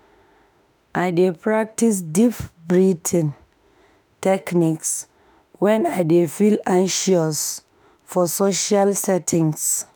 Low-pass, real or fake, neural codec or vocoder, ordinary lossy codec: none; fake; autoencoder, 48 kHz, 32 numbers a frame, DAC-VAE, trained on Japanese speech; none